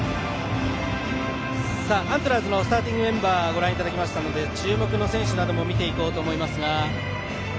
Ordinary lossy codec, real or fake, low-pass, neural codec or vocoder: none; real; none; none